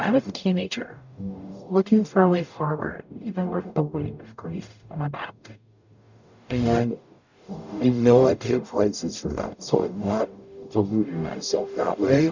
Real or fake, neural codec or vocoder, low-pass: fake; codec, 44.1 kHz, 0.9 kbps, DAC; 7.2 kHz